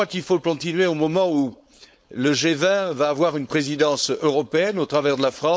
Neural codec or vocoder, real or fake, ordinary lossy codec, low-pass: codec, 16 kHz, 4.8 kbps, FACodec; fake; none; none